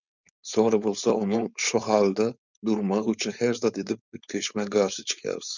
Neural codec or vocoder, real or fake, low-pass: codec, 16 kHz, 4.8 kbps, FACodec; fake; 7.2 kHz